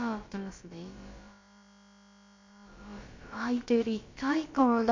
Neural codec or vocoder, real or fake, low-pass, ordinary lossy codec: codec, 16 kHz, about 1 kbps, DyCAST, with the encoder's durations; fake; 7.2 kHz; MP3, 48 kbps